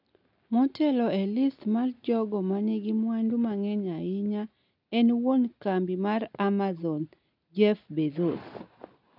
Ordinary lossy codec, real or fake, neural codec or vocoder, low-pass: none; real; none; 5.4 kHz